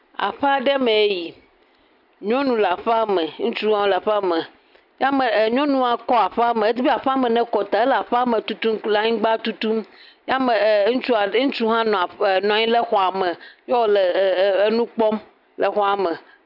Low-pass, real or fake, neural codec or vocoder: 5.4 kHz; real; none